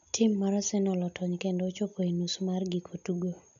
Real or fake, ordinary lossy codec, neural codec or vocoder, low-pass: real; none; none; 7.2 kHz